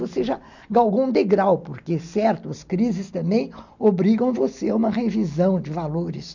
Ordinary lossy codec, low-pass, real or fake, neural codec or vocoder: none; 7.2 kHz; real; none